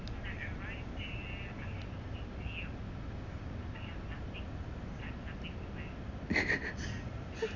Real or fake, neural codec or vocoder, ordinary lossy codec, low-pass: real; none; none; 7.2 kHz